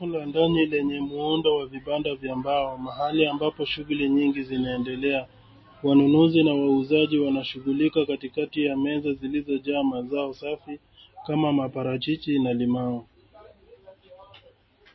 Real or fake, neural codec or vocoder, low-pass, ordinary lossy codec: real; none; 7.2 kHz; MP3, 24 kbps